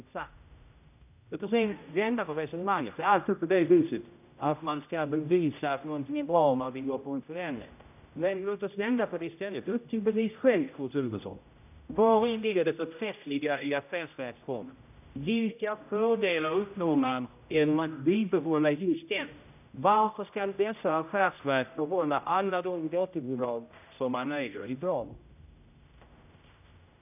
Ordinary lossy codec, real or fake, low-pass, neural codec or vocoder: none; fake; 3.6 kHz; codec, 16 kHz, 0.5 kbps, X-Codec, HuBERT features, trained on general audio